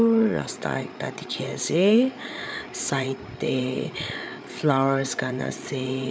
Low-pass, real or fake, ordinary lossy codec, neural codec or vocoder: none; fake; none; codec, 16 kHz, 8 kbps, FreqCodec, larger model